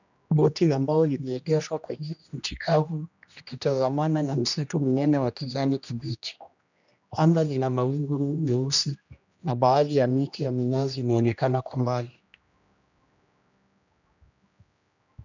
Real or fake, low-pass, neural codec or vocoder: fake; 7.2 kHz; codec, 16 kHz, 1 kbps, X-Codec, HuBERT features, trained on general audio